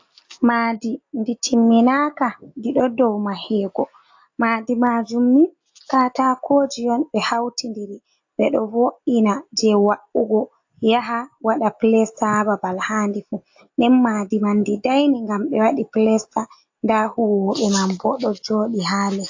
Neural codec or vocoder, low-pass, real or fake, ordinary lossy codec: none; 7.2 kHz; real; AAC, 48 kbps